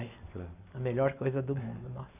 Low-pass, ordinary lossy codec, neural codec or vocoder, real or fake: 3.6 kHz; AAC, 32 kbps; none; real